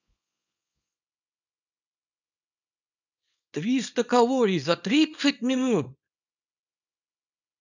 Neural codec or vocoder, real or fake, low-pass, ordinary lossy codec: codec, 24 kHz, 0.9 kbps, WavTokenizer, small release; fake; 7.2 kHz; none